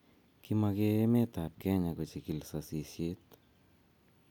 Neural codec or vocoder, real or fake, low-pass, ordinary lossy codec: none; real; none; none